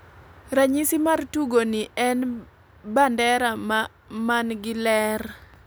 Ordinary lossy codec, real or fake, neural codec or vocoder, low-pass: none; real; none; none